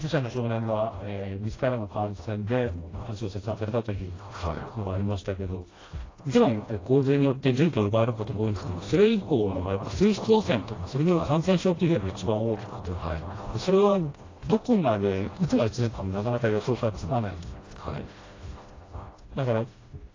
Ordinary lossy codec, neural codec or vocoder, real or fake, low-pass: AAC, 32 kbps; codec, 16 kHz, 1 kbps, FreqCodec, smaller model; fake; 7.2 kHz